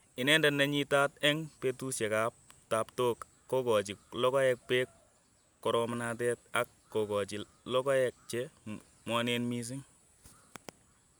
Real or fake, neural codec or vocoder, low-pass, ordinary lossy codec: real; none; none; none